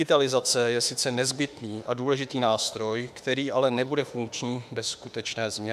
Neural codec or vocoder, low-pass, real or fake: autoencoder, 48 kHz, 32 numbers a frame, DAC-VAE, trained on Japanese speech; 14.4 kHz; fake